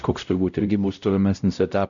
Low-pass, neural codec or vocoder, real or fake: 7.2 kHz; codec, 16 kHz, 0.5 kbps, X-Codec, WavLM features, trained on Multilingual LibriSpeech; fake